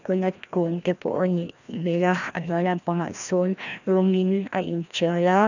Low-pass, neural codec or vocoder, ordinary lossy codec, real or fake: 7.2 kHz; codec, 16 kHz, 1 kbps, FreqCodec, larger model; none; fake